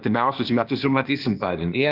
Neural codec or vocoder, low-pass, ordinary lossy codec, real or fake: codec, 16 kHz, 0.8 kbps, ZipCodec; 5.4 kHz; Opus, 32 kbps; fake